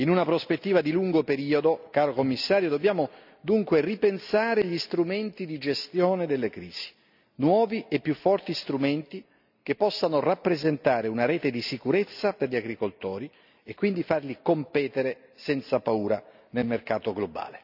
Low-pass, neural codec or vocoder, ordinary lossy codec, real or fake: 5.4 kHz; none; none; real